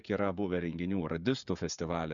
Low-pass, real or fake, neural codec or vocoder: 7.2 kHz; fake; codec, 16 kHz, 16 kbps, FreqCodec, smaller model